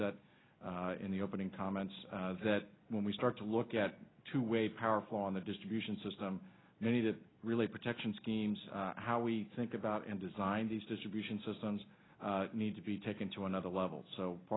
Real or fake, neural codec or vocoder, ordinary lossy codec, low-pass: real; none; AAC, 16 kbps; 7.2 kHz